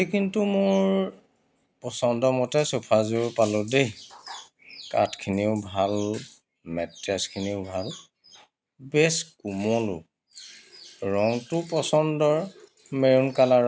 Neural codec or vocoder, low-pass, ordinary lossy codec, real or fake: none; none; none; real